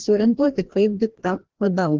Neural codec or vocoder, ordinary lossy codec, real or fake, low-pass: codec, 16 kHz, 1 kbps, FreqCodec, larger model; Opus, 16 kbps; fake; 7.2 kHz